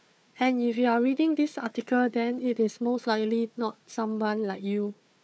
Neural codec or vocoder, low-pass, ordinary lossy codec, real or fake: codec, 16 kHz, 4 kbps, FunCodec, trained on Chinese and English, 50 frames a second; none; none; fake